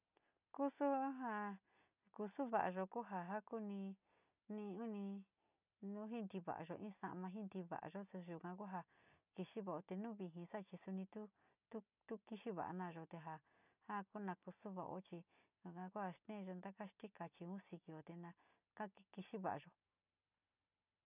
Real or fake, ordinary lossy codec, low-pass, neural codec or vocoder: real; none; 3.6 kHz; none